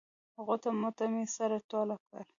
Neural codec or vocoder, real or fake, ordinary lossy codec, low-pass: none; real; AAC, 64 kbps; 7.2 kHz